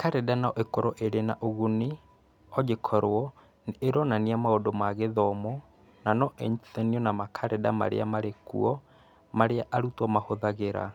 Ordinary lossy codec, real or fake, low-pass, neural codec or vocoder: none; real; 19.8 kHz; none